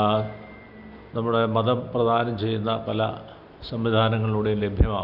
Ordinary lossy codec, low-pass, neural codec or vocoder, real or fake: Opus, 64 kbps; 5.4 kHz; none; real